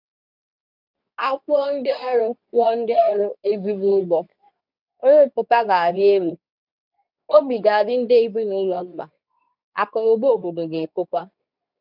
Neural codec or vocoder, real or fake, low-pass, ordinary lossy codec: codec, 24 kHz, 0.9 kbps, WavTokenizer, medium speech release version 2; fake; 5.4 kHz; none